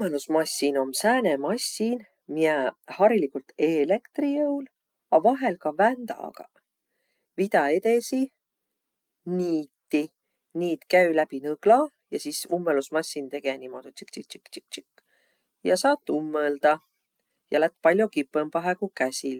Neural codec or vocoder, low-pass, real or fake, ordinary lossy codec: none; 19.8 kHz; real; Opus, 32 kbps